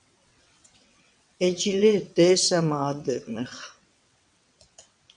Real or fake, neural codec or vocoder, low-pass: fake; vocoder, 22.05 kHz, 80 mel bands, WaveNeXt; 9.9 kHz